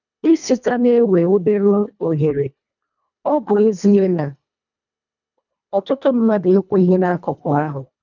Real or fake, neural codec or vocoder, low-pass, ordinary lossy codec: fake; codec, 24 kHz, 1.5 kbps, HILCodec; 7.2 kHz; none